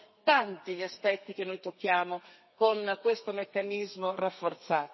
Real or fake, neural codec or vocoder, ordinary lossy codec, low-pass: fake; codec, 32 kHz, 1.9 kbps, SNAC; MP3, 24 kbps; 7.2 kHz